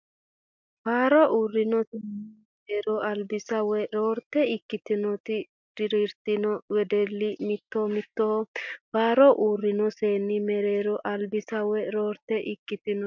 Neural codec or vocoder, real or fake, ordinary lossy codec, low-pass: none; real; MP3, 64 kbps; 7.2 kHz